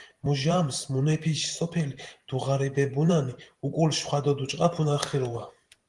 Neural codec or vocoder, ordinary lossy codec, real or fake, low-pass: vocoder, 44.1 kHz, 128 mel bands every 512 samples, BigVGAN v2; Opus, 24 kbps; fake; 10.8 kHz